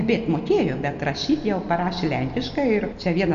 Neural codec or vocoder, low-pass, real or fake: none; 7.2 kHz; real